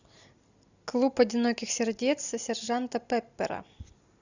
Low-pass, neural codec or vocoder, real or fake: 7.2 kHz; none; real